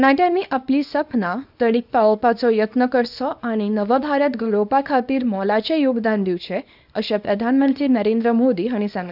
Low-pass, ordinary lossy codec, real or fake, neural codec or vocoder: 5.4 kHz; none; fake; codec, 24 kHz, 0.9 kbps, WavTokenizer, small release